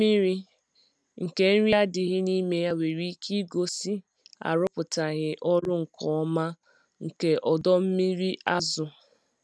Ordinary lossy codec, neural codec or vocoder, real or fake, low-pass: none; none; real; none